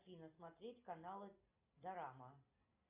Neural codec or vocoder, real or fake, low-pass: none; real; 3.6 kHz